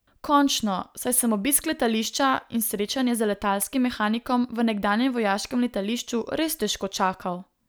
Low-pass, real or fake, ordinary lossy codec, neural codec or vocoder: none; real; none; none